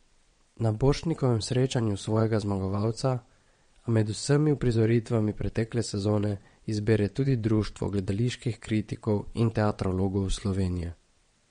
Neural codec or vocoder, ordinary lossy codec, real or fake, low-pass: vocoder, 22.05 kHz, 80 mel bands, WaveNeXt; MP3, 48 kbps; fake; 9.9 kHz